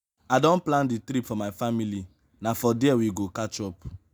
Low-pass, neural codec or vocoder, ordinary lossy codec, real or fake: none; none; none; real